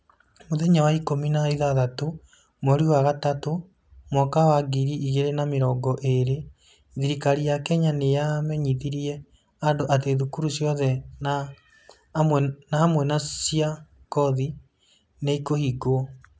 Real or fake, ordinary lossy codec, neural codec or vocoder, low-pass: real; none; none; none